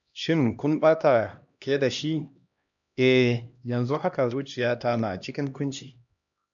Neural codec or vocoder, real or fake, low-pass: codec, 16 kHz, 1 kbps, X-Codec, HuBERT features, trained on LibriSpeech; fake; 7.2 kHz